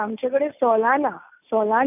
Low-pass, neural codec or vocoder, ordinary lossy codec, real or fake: 3.6 kHz; none; none; real